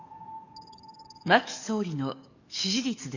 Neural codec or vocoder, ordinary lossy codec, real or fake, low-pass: codec, 44.1 kHz, 7.8 kbps, DAC; none; fake; 7.2 kHz